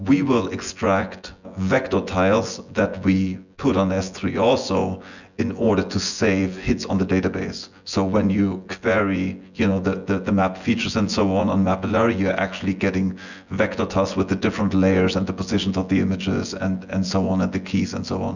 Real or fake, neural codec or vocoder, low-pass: fake; vocoder, 24 kHz, 100 mel bands, Vocos; 7.2 kHz